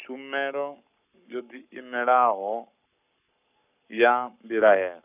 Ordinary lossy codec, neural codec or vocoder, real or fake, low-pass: none; codec, 44.1 kHz, 7.8 kbps, Pupu-Codec; fake; 3.6 kHz